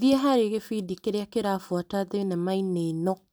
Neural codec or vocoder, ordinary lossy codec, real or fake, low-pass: none; none; real; none